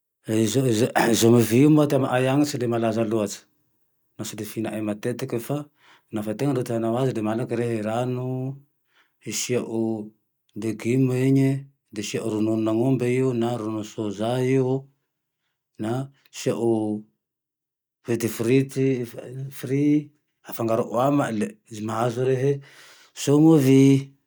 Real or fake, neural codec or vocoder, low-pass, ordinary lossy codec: real; none; none; none